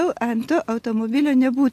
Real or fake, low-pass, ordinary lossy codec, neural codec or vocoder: real; 14.4 kHz; AAC, 64 kbps; none